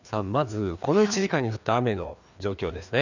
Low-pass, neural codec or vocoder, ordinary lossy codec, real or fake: 7.2 kHz; codec, 16 kHz, 2 kbps, FreqCodec, larger model; none; fake